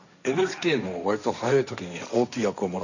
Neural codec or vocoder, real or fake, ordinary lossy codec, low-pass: codec, 16 kHz, 1.1 kbps, Voila-Tokenizer; fake; none; 7.2 kHz